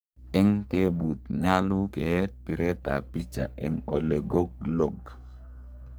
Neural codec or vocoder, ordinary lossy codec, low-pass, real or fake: codec, 44.1 kHz, 3.4 kbps, Pupu-Codec; none; none; fake